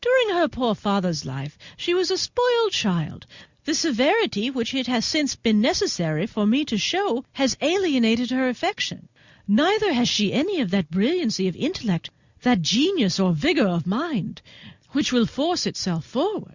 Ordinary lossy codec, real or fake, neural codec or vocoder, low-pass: Opus, 64 kbps; real; none; 7.2 kHz